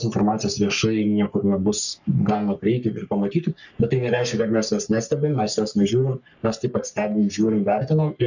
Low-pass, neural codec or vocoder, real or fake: 7.2 kHz; codec, 44.1 kHz, 3.4 kbps, Pupu-Codec; fake